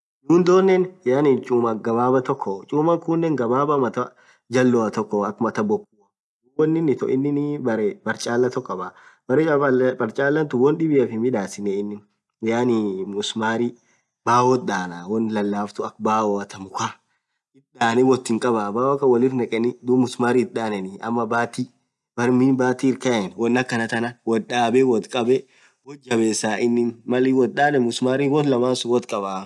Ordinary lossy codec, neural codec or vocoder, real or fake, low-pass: none; none; real; none